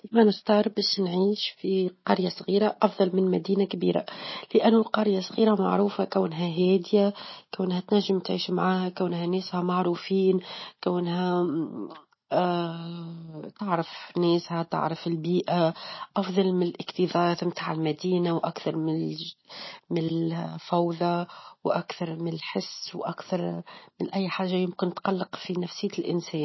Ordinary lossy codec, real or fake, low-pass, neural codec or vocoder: MP3, 24 kbps; real; 7.2 kHz; none